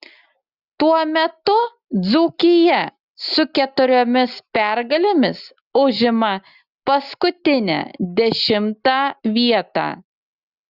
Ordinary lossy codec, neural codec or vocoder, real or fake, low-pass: Opus, 64 kbps; none; real; 5.4 kHz